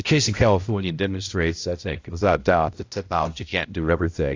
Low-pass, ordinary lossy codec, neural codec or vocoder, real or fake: 7.2 kHz; AAC, 48 kbps; codec, 16 kHz, 0.5 kbps, X-Codec, HuBERT features, trained on balanced general audio; fake